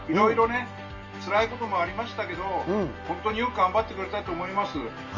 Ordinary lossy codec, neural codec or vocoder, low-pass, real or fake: Opus, 32 kbps; none; 7.2 kHz; real